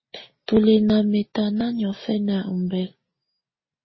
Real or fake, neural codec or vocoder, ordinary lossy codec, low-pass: real; none; MP3, 24 kbps; 7.2 kHz